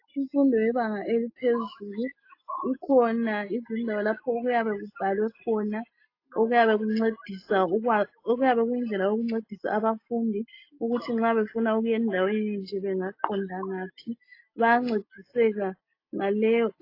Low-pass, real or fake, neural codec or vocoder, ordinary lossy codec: 5.4 kHz; real; none; AAC, 32 kbps